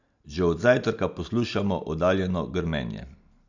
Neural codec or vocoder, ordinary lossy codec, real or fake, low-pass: none; none; real; 7.2 kHz